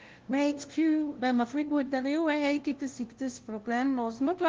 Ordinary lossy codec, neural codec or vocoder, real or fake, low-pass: Opus, 16 kbps; codec, 16 kHz, 0.5 kbps, FunCodec, trained on LibriTTS, 25 frames a second; fake; 7.2 kHz